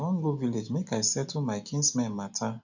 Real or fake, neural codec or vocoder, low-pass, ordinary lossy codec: real; none; 7.2 kHz; none